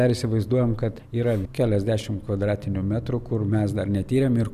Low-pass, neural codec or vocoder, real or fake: 14.4 kHz; none; real